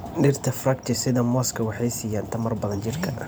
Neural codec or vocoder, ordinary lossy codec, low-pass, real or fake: vocoder, 44.1 kHz, 128 mel bands every 512 samples, BigVGAN v2; none; none; fake